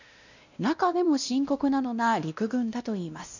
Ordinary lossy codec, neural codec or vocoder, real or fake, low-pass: none; codec, 16 kHz, 1 kbps, X-Codec, WavLM features, trained on Multilingual LibriSpeech; fake; 7.2 kHz